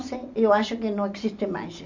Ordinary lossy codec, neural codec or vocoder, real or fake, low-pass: MP3, 48 kbps; none; real; 7.2 kHz